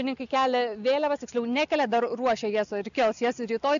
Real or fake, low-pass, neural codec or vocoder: real; 7.2 kHz; none